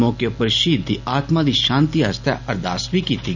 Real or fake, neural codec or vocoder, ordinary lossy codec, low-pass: real; none; none; 7.2 kHz